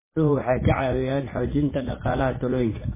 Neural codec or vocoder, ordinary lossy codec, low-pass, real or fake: vocoder, 44.1 kHz, 80 mel bands, Vocos; MP3, 16 kbps; 3.6 kHz; fake